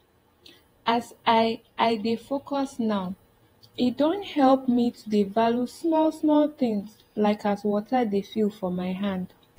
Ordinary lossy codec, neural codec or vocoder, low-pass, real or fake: AAC, 48 kbps; vocoder, 48 kHz, 128 mel bands, Vocos; 19.8 kHz; fake